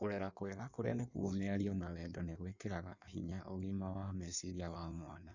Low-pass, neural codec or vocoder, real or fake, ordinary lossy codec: 7.2 kHz; codec, 16 kHz in and 24 kHz out, 1.1 kbps, FireRedTTS-2 codec; fake; none